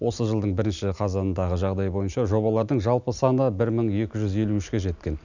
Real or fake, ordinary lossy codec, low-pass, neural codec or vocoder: real; none; 7.2 kHz; none